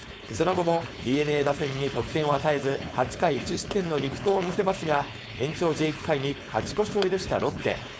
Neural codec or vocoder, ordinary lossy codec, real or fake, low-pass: codec, 16 kHz, 4.8 kbps, FACodec; none; fake; none